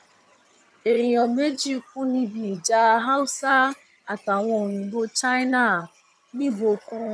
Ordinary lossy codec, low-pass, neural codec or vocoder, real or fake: none; none; vocoder, 22.05 kHz, 80 mel bands, HiFi-GAN; fake